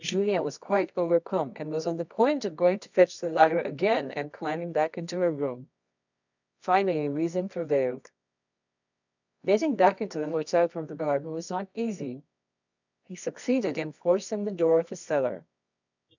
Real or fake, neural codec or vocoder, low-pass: fake; codec, 24 kHz, 0.9 kbps, WavTokenizer, medium music audio release; 7.2 kHz